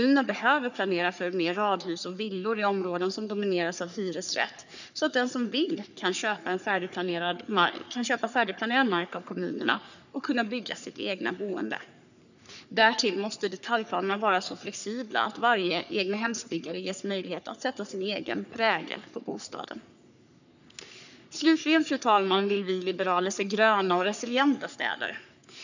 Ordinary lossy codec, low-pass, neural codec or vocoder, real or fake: none; 7.2 kHz; codec, 44.1 kHz, 3.4 kbps, Pupu-Codec; fake